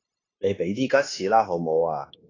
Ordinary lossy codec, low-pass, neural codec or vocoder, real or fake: AAC, 32 kbps; 7.2 kHz; codec, 16 kHz, 0.9 kbps, LongCat-Audio-Codec; fake